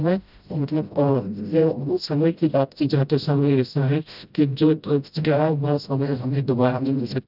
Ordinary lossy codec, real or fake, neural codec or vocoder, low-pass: none; fake; codec, 16 kHz, 0.5 kbps, FreqCodec, smaller model; 5.4 kHz